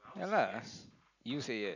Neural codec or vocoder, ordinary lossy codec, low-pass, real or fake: none; none; 7.2 kHz; real